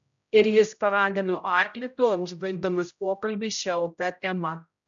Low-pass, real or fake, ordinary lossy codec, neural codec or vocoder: 7.2 kHz; fake; MP3, 64 kbps; codec, 16 kHz, 0.5 kbps, X-Codec, HuBERT features, trained on general audio